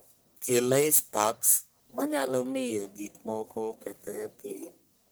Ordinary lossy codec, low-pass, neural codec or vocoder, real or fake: none; none; codec, 44.1 kHz, 1.7 kbps, Pupu-Codec; fake